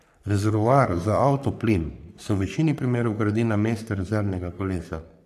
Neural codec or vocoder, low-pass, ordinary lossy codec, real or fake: codec, 44.1 kHz, 3.4 kbps, Pupu-Codec; 14.4 kHz; none; fake